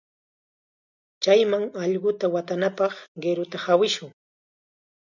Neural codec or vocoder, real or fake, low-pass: none; real; 7.2 kHz